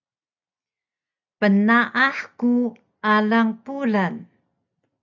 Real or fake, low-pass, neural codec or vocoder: real; 7.2 kHz; none